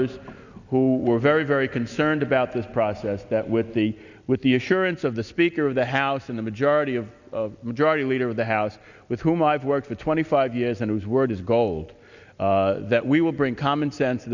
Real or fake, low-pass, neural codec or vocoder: real; 7.2 kHz; none